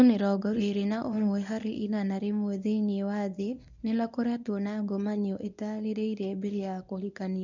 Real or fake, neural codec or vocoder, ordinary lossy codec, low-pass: fake; codec, 24 kHz, 0.9 kbps, WavTokenizer, medium speech release version 1; none; 7.2 kHz